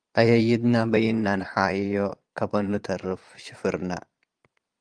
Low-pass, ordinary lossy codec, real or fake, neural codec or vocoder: 9.9 kHz; Opus, 32 kbps; fake; vocoder, 22.05 kHz, 80 mel bands, Vocos